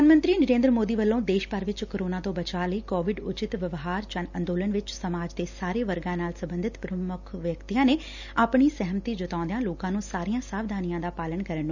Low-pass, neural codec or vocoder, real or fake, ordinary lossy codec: 7.2 kHz; none; real; none